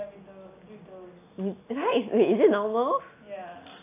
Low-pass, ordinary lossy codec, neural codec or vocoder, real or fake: 3.6 kHz; AAC, 24 kbps; none; real